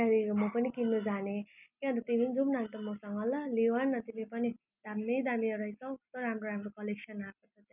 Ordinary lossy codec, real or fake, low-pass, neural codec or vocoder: none; real; 3.6 kHz; none